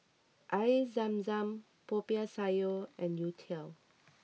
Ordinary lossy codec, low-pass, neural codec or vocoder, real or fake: none; none; none; real